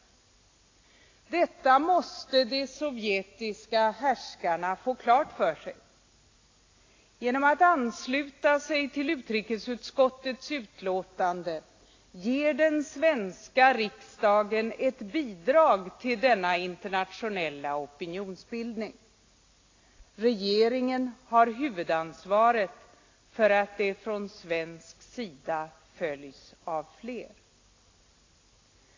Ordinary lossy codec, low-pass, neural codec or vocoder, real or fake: AAC, 32 kbps; 7.2 kHz; none; real